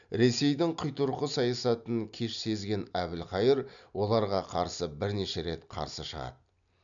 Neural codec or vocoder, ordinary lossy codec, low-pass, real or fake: none; none; 7.2 kHz; real